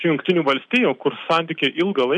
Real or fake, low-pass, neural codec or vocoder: real; 10.8 kHz; none